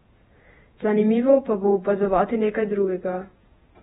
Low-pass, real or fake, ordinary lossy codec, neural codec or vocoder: 19.8 kHz; fake; AAC, 16 kbps; vocoder, 48 kHz, 128 mel bands, Vocos